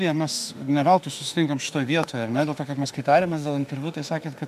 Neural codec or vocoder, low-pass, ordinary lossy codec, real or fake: autoencoder, 48 kHz, 32 numbers a frame, DAC-VAE, trained on Japanese speech; 14.4 kHz; MP3, 96 kbps; fake